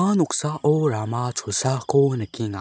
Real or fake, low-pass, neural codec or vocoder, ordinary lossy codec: real; none; none; none